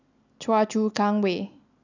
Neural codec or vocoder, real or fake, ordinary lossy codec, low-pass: none; real; none; 7.2 kHz